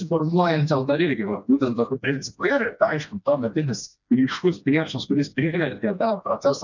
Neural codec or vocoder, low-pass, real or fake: codec, 16 kHz, 1 kbps, FreqCodec, smaller model; 7.2 kHz; fake